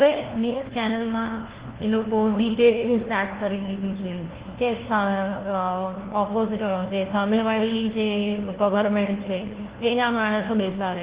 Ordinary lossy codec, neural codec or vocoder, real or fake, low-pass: Opus, 16 kbps; codec, 16 kHz, 1 kbps, FunCodec, trained on LibriTTS, 50 frames a second; fake; 3.6 kHz